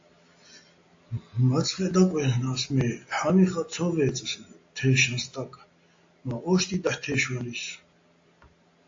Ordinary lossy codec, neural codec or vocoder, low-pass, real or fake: AAC, 48 kbps; none; 7.2 kHz; real